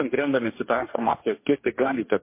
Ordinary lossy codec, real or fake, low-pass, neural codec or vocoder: MP3, 24 kbps; fake; 3.6 kHz; codec, 44.1 kHz, 2.6 kbps, DAC